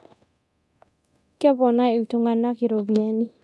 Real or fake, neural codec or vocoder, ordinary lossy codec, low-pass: fake; codec, 24 kHz, 0.9 kbps, DualCodec; none; none